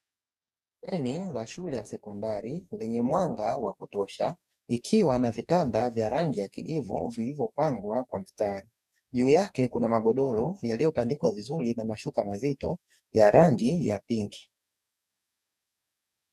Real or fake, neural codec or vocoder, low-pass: fake; codec, 44.1 kHz, 2.6 kbps, DAC; 14.4 kHz